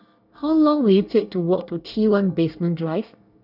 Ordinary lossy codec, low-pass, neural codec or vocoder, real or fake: none; 5.4 kHz; codec, 24 kHz, 1 kbps, SNAC; fake